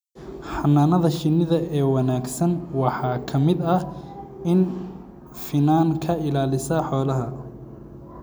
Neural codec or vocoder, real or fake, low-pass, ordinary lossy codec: none; real; none; none